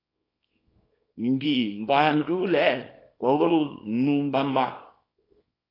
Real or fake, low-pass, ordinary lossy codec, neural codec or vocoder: fake; 5.4 kHz; AAC, 24 kbps; codec, 24 kHz, 0.9 kbps, WavTokenizer, small release